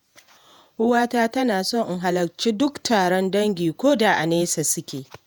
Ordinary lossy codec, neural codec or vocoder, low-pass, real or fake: none; vocoder, 48 kHz, 128 mel bands, Vocos; none; fake